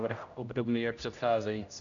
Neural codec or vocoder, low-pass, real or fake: codec, 16 kHz, 0.5 kbps, X-Codec, HuBERT features, trained on general audio; 7.2 kHz; fake